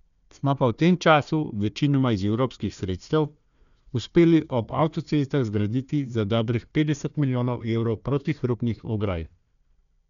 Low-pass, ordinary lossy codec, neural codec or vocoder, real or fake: 7.2 kHz; none; codec, 16 kHz, 1 kbps, FunCodec, trained on Chinese and English, 50 frames a second; fake